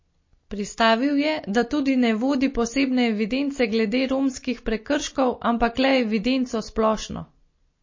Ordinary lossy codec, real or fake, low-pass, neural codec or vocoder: MP3, 32 kbps; real; 7.2 kHz; none